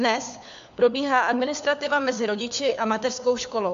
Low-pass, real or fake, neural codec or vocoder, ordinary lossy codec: 7.2 kHz; fake; codec, 16 kHz, 4 kbps, FunCodec, trained on LibriTTS, 50 frames a second; AAC, 64 kbps